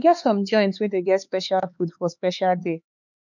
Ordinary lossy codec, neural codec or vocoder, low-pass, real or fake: none; codec, 16 kHz, 4 kbps, X-Codec, HuBERT features, trained on LibriSpeech; 7.2 kHz; fake